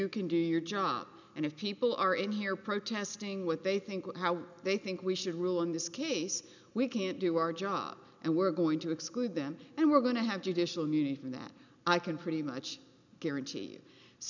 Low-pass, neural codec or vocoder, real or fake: 7.2 kHz; none; real